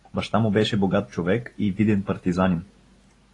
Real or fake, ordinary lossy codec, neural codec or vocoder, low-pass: real; AAC, 32 kbps; none; 10.8 kHz